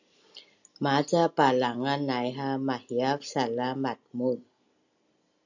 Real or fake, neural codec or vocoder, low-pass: real; none; 7.2 kHz